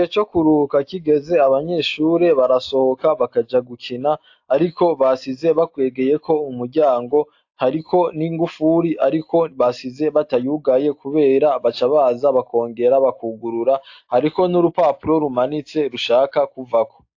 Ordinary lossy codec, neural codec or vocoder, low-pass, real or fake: AAC, 48 kbps; none; 7.2 kHz; real